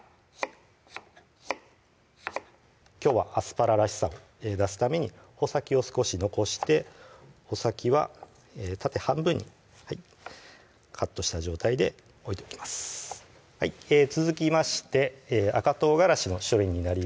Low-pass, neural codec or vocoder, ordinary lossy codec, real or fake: none; none; none; real